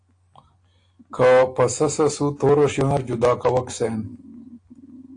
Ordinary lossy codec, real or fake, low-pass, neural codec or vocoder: AAC, 48 kbps; real; 9.9 kHz; none